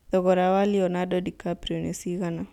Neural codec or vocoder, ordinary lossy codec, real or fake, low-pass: none; none; real; 19.8 kHz